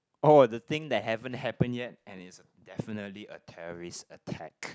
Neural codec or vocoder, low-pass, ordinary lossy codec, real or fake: none; none; none; real